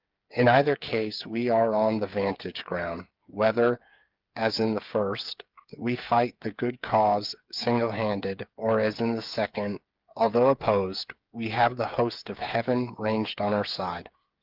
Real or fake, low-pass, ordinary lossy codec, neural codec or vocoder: fake; 5.4 kHz; Opus, 32 kbps; codec, 16 kHz, 8 kbps, FreqCodec, smaller model